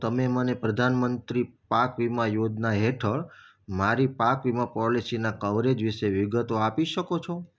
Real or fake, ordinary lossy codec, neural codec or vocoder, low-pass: real; none; none; none